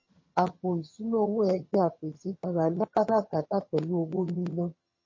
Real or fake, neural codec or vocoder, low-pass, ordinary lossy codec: fake; vocoder, 22.05 kHz, 80 mel bands, HiFi-GAN; 7.2 kHz; MP3, 32 kbps